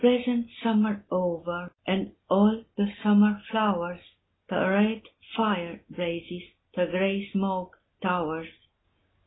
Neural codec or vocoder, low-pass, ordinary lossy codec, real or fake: none; 7.2 kHz; AAC, 16 kbps; real